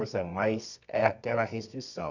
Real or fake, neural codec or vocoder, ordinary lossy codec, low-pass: fake; codec, 24 kHz, 0.9 kbps, WavTokenizer, medium music audio release; none; 7.2 kHz